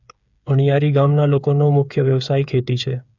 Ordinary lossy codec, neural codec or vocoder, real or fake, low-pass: none; codec, 16 kHz, 8 kbps, FreqCodec, smaller model; fake; 7.2 kHz